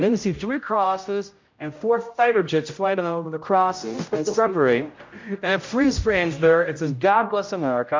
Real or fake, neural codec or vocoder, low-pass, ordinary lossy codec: fake; codec, 16 kHz, 0.5 kbps, X-Codec, HuBERT features, trained on general audio; 7.2 kHz; MP3, 48 kbps